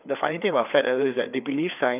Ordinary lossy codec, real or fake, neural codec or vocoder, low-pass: none; fake; codec, 16 kHz, 4 kbps, FreqCodec, larger model; 3.6 kHz